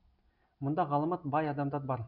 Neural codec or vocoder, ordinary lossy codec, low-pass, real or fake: none; none; 5.4 kHz; real